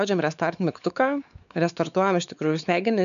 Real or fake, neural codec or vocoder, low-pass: fake; codec, 16 kHz, 4 kbps, X-Codec, WavLM features, trained on Multilingual LibriSpeech; 7.2 kHz